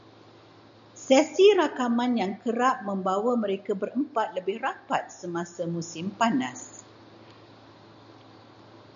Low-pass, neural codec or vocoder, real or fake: 7.2 kHz; none; real